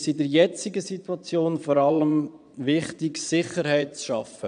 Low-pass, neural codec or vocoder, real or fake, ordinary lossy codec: 9.9 kHz; vocoder, 22.05 kHz, 80 mel bands, Vocos; fake; none